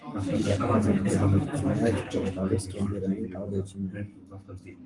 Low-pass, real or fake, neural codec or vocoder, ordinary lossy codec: 10.8 kHz; fake; codec, 44.1 kHz, 7.8 kbps, Pupu-Codec; Opus, 32 kbps